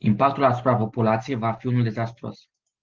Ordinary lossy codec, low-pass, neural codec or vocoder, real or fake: Opus, 16 kbps; 7.2 kHz; none; real